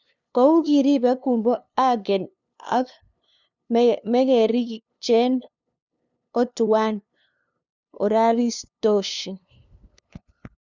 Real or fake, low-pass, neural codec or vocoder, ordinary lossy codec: fake; 7.2 kHz; codec, 16 kHz, 2 kbps, FunCodec, trained on LibriTTS, 25 frames a second; none